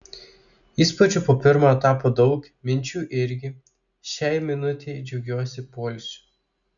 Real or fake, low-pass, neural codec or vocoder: real; 7.2 kHz; none